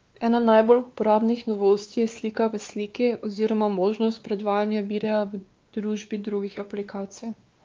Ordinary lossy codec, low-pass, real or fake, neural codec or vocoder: Opus, 24 kbps; 7.2 kHz; fake; codec, 16 kHz, 2 kbps, X-Codec, WavLM features, trained on Multilingual LibriSpeech